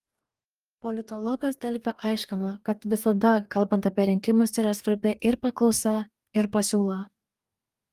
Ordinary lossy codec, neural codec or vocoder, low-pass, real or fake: Opus, 32 kbps; codec, 44.1 kHz, 2.6 kbps, DAC; 14.4 kHz; fake